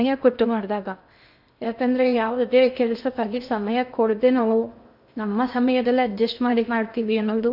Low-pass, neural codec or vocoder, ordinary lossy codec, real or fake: 5.4 kHz; codec, 16 kHz in and 24 kHz out, 0.6 kbps, FocalCodec, streaming, 2048 codes; none; fake